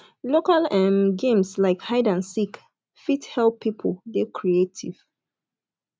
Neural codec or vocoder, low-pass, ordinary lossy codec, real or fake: none; none; none; real